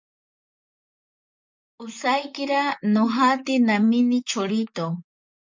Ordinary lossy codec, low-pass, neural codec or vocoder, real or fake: MP3, 64 kbps; 7.2 kHz; vocoder, 44.1 kHz, 128 mel bands, Pupu-Vocoder; fake